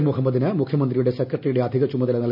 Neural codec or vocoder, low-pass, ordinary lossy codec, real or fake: none; 5.4 kHz; AAC, 48 kbps; real